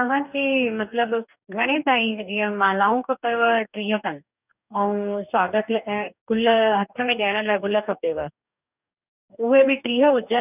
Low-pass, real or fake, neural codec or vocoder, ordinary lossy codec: 3.6 kHz; fake; codec, 44.1 kHz, 2.6 kbps, DAC; none